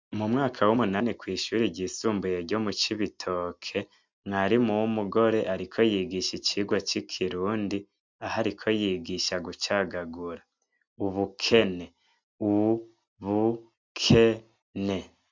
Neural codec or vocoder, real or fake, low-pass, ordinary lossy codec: none; real; 7.2 kHz; MP3, 64 kbps